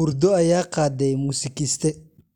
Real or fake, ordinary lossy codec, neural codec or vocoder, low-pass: real; none; none; 19.8 kHz